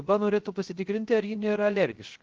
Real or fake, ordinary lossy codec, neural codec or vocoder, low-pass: fake; Opus, 16 kbps; codec, 16 kHz, 0.3 kbps, FocalCodec; 7.2 kHz